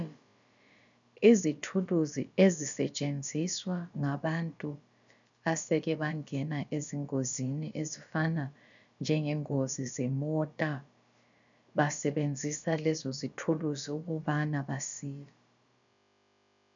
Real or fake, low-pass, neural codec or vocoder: fake; 7.2 kHz; codec, 16 kHz, about 1 kbps, DyCAST, with the encoder's durations